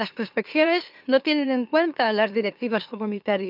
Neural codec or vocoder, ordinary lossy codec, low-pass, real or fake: autoencoder, 44.1 kHz, a latent of 192 numbers a frame, MeloTTS; none; 5.4 kHz; fake